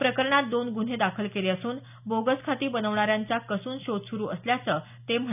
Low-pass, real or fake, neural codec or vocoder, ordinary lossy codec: 3.6 kHz; real; none; none